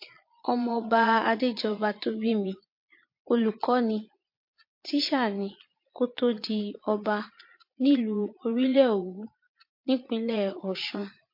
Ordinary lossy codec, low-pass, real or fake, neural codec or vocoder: MP3, 32 kbps; 5.4 kHz; fake; vocoder, 22.05 kHz, 80 mel bands, Vocos